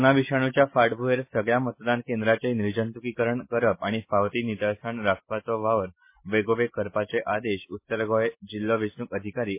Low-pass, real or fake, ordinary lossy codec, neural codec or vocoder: 3.6 kHz; real; MP3, 24 kbps; none